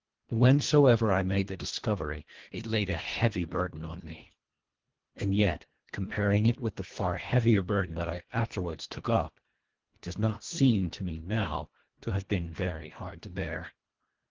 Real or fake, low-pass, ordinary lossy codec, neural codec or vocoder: fake; 7.2 kHz; Opus, 16 kbps; codec, 24 kHz, 1.5 kbps, HILCodec